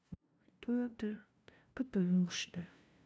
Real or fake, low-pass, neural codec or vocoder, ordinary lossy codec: fake; none; codec, 16 kHz, 0.5 kbps, FunCodec, trained on LibriTTS, 25 frames a second; none